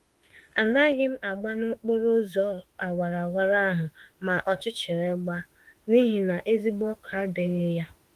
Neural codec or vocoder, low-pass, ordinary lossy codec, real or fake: autoencoder, 48 kHz, 32 numbers a frame, DAC-VAE, trained on Japanese speech; 14.4 kHz; Opus, 24 kbps; fake